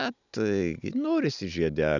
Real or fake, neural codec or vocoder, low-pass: real; none; 7.2 kHz